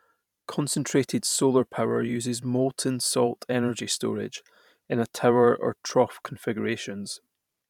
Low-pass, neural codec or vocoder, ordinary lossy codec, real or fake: 19.8 kHz; vocoder, 44.1 kHz, 128 mel bands every 512 samples, BigVGAN v2; none; fake